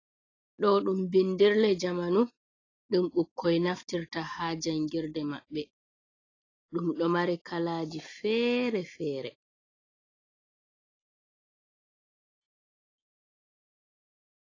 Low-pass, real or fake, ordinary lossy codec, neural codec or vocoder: 7.2 kHz; real; AAC, 32 kbps; none